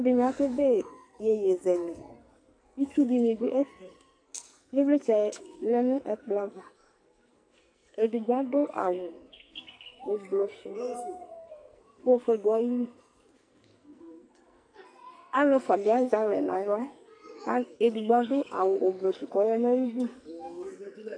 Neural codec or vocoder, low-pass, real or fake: codec, 44.1 kHz, 2.6 kbps, SNAC; 9.9 kHz; fake